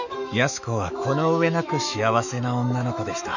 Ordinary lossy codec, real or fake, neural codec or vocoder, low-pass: none; fake; autoencoder, 48 kHz, 128 numbers a frame, DAC-VAE, trained on Japanese speech; 7.2 kHz